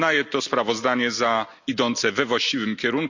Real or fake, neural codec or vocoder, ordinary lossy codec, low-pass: real; none; none; 7.2 kHz